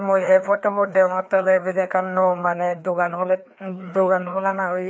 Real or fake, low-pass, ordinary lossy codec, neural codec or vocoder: fake; none; none; codec, 16 kHz, 2 kbps, FreqCodec, larger model